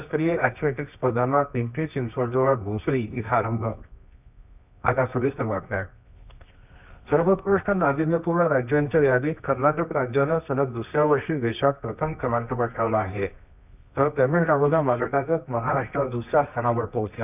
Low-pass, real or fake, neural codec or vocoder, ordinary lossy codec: 3.6 kHz; fake; codec, 24 kHz, 0.9 kbps, WavTokenizer, medium music audio release; none